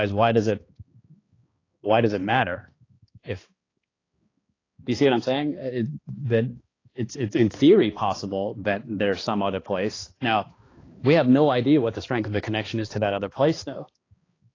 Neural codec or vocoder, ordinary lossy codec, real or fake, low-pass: codec, 16 kHz, 2 kbps, X-Codec, HuBERT features, trained on balanced general audio; AAC, 32 kbps; fake; 7.2 kHz